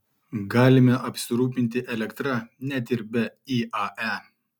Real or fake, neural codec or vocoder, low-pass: real; none; 19.8 kHz